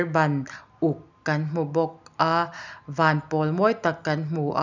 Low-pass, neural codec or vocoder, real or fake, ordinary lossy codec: 7.2 kHz; none; real; none